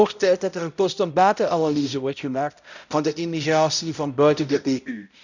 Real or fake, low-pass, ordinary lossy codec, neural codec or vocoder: fake; 7.2 kHz; none; codec, 16 kHz, 0.5 kbps, X-Codec, HuBERT features, trained on balanced general audio